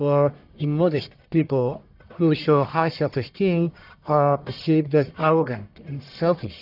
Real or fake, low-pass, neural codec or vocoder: fake; 5.4 kHz; codec, 44.1 kHz, 1.7 kbps, Pupu-Codec